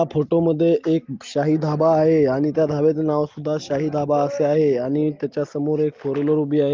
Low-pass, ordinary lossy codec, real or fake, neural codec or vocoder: 7.2 kHz; Opus, 32 kbps; real; none